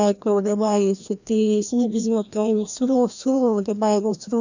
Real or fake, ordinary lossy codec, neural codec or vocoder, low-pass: fake; none; codec, 16 kHz, 1 kbps, FreqCodec, larger model; 7.2 kHz